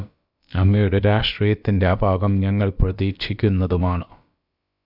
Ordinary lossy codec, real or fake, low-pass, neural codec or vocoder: Opus, 64 kbps; fake; 5.4 kHz; codec, 16 kHz, about 1 kbps, DyCAST, with the encoder's durations